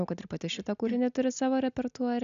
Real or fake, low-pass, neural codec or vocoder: real; 7.2 kHz; none